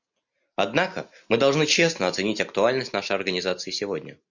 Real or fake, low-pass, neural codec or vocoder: real; 7.2 kHz; none